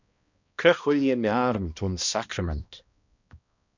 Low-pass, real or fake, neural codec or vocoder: 7.2 kHz; fake; codec, 16 kHz, 1 kbps, X-Codec, HuBERT features, trained on balanced general audio